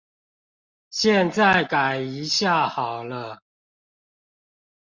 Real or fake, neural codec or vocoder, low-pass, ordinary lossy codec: real; none; 7.2 kHz; Opus, 64 kbps